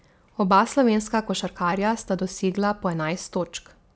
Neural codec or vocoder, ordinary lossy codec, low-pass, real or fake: none; none; none; real